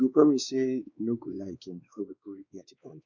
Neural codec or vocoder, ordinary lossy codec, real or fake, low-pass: codec, 16 kHz, 2 kbps, X-Codec, WavLM features, trained on Multilingual LibriSpeech; Opus, 64 kbps; fake; 7.2 kHz